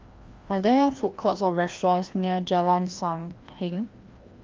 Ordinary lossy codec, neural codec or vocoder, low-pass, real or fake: Opus, 32 kbps; codec, 16 kHz, 1 kbps, FreqCodec, larger model; 7.2 kHz; fake